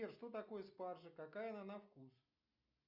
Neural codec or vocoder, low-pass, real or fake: none; 5.4 kHz; real